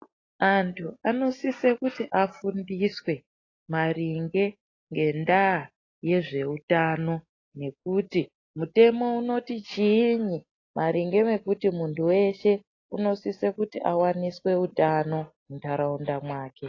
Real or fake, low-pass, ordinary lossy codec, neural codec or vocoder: real; 7.2 kHz; AAC, 32 kbps; none